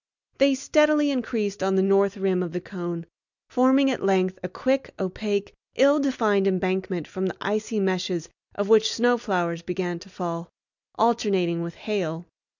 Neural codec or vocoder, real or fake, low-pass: none; real; 7.2 kHz